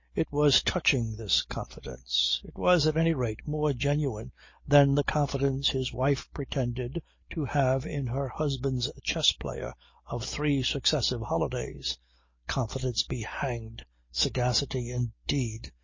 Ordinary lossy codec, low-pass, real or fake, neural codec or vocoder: MP3, 32 kbps; 7.2 kHz; real; none